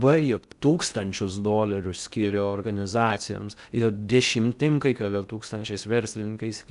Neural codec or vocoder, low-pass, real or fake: codec, 16 kHz in and 24 kHz out, 0.6 kbps, FocalCodec, streaming, 2048 codes; 10.8 kHz; fake